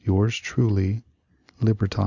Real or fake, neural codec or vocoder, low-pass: real; none; 7.2 kHz